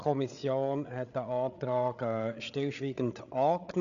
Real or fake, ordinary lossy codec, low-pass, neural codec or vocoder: fake; none; 7.2 kHz; codec, 16 kHz, 8 kbps, FreqCodec, larger model